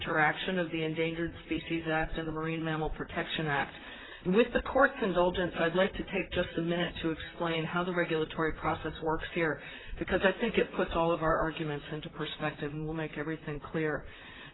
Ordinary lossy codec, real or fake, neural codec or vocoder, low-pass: AAC, 16 kbps; fake; vocoder, 44.1 kHz, 128 mel bands, Pupu-Vocoder; 7.2 kHz